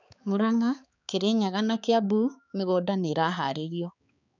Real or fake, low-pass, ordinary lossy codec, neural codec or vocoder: fake; 7.2 kHz; none; codec, 16 kHz, 4 kbps, X-Codec, HuBERT features, trained on balanced general audio